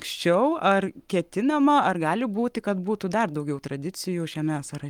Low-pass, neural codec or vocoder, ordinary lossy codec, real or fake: 19.8 kHz; codec, 44.1 kHz, 7.8 kbps, Pupu-Codec; Opus, 32 kbps; fake